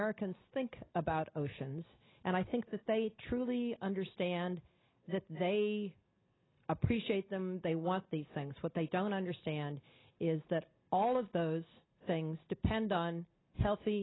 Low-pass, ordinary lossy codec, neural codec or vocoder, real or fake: 7.2 kHz; AAC, 16 kbps; none; real